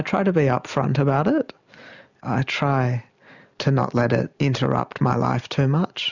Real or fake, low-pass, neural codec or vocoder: real; 7.2 kHz; none